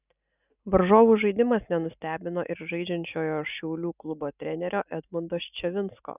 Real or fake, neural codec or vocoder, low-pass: real; none; 3.6 kHz